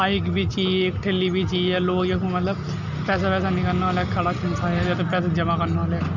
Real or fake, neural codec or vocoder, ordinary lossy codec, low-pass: real; none; none; 7.2 kHz